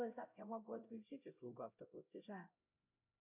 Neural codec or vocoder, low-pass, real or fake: codec, 16 kHz, 0.5 kbps, X-Codec, HuBERT features, trained on LibriSpeech; 3.6 kHz; fake